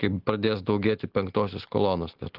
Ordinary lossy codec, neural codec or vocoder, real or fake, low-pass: Opus, 16 kbps; none; real; 5.4 kHz